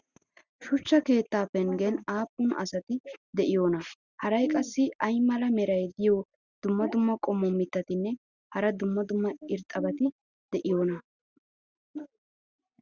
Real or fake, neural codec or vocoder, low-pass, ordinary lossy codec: real; none; 7.2 kHz; Opus, 64 kbps